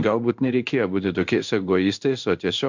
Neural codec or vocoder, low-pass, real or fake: codec, 16 kHz in and 24 kHz out, 1 kbps, XY-Tokenizer; 7.2 kHz; fake